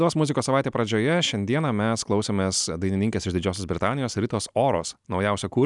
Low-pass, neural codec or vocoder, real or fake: 10.8 kHz; none; real